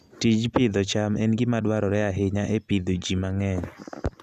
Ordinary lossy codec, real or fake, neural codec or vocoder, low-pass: none; real; none; 14.4 kHz